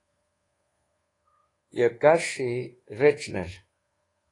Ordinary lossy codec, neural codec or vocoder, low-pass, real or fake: AAC, 32 kbps; codec, 24 kHz, 1.2 kbps, DualCodec; 10.8 kHz; fake